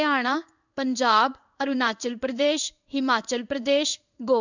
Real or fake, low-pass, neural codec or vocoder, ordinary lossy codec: fake; 7.2 kHz; codec, 16 kHz in and 24 kHz out, 1 kbps, XY-Tokenizer; none